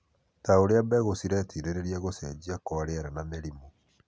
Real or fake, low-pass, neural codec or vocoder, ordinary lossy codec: real; none; none; none